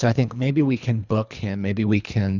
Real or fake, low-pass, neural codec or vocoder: fake; 7.2 kHz; codec, 24 kHz, 3 kbps, HILCodec